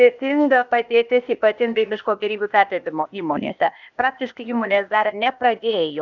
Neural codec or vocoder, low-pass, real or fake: codec, 16 kHz, 0.8 kbps, ZipCodec; 7.2 kHz; fake